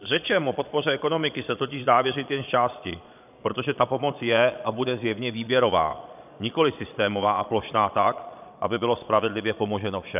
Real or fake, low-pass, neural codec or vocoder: fake; 3.6 kHz; vocoder, 22.05 kHz, 80 mel bands, Vocos